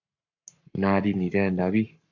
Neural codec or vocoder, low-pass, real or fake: codec, 44.1 kHz, 7.8 kbps, Pupu-Codec; 7.2 kHz; fake